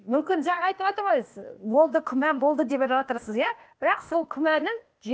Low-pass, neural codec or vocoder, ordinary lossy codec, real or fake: none; codec, 16 kHz, 0.8 kbps, ZipCodec; none; fake